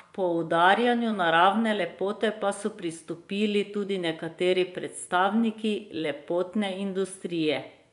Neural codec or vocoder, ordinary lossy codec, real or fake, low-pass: vocoder, 24 kHz, 100 mel bands, Vocos; none; fake; 10.8 kHz